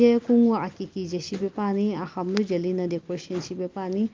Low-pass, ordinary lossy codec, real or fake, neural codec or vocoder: 7.2 kHz; Opus, 32 kbps; real; none